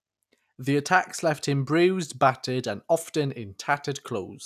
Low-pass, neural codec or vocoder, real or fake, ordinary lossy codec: 14.4 kHz; none; real; none